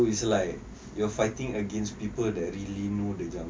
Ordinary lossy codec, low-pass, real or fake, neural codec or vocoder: none; none; real; none